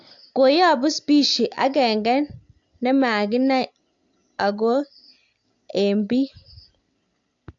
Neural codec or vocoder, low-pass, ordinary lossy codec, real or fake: none; 7.2 kHz; AAC, 64 kbps; real